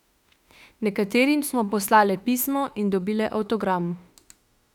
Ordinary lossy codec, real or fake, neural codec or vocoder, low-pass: none; fake; autoencoder, 48 kHz, 32 numbers a frame, DAC-VAE, trained on Japanese speech; 19.8 kHz